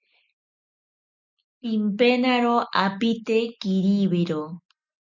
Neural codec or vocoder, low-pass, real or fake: none; 7.2 kHz; real